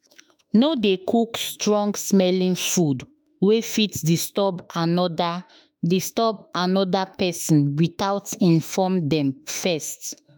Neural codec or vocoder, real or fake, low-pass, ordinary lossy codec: autoencoder, 48 kHz, 32 numbers a frame, DAC-VAE, trained on Japanese speech; fake; none; none